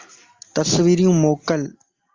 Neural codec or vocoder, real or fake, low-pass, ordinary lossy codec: none; real; 7.2 kHz; Opus, 32 kbps